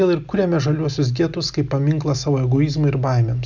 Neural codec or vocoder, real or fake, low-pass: none; real; 7.2 kHz